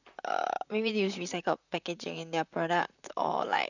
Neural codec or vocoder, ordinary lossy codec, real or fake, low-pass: vocoder, 44.1 kHz, 128 mel bands, Pupu-Vocoder; none; fake; 7.2 kHz